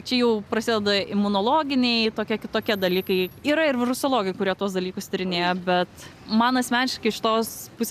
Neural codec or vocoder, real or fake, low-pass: none; real; 14.4 kHz